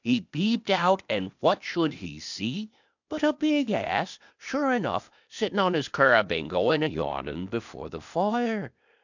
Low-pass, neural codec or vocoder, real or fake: 7.2 kHz; codec, 16 kHz, 0.8 kbps, ZipCodec; fake